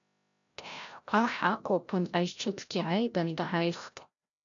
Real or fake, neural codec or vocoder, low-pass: fake; codec, 16 kHz, 0.5 kbps, FreqCodec, larger model; 7.2 kHz